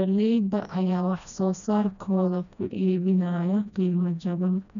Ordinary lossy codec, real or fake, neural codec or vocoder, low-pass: none; fake; codec, 16 kHz, 1 kbps, FreqCodec, smaller model; 7.2 kHz